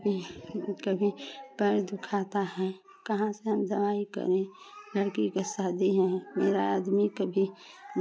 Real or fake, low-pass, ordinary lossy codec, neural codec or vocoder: real; none; none; none